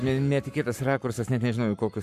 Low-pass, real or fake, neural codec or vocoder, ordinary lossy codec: 14.4 kHz; fake; codec, 44.1 kHz, 7.8 kbps, Pupu-Codec; MP3, 96 kbps